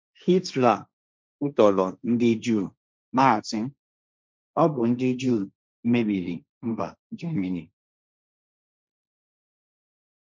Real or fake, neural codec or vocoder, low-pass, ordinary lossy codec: fake; codec, 16 kHz, 1.1 kbps, Voila-Tokenizer; none; none